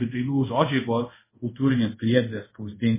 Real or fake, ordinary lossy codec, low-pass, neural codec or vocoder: fake; MP3, 16 kbps; 3.6 kHz; codec, 24 kHz, 0.5 kbps, DualCodec